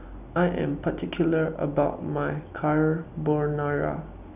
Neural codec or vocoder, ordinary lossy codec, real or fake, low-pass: none; none; real; 3.6 kHz